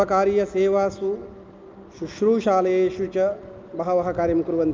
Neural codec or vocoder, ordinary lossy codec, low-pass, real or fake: none; Opus, 24 kbps; 7.2 kHz; real